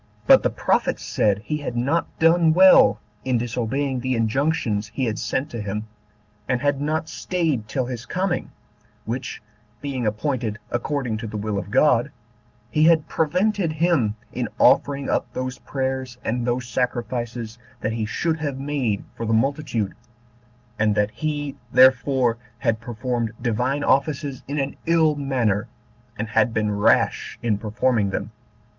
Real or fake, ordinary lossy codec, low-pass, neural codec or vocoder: real; Opus, 32 kbps; 7.2 kHz; none